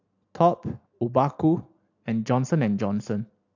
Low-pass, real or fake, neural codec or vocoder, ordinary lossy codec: 7.2 kHz; real; none; AAC, 48 kbps